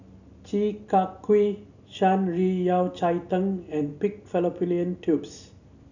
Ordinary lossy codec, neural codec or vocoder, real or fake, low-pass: none; none; real; 7.2 kHz